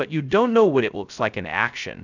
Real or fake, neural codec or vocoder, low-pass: fake; codec, 16 kHz, 0.2 kbps, FocalCodec; 7.2 kHz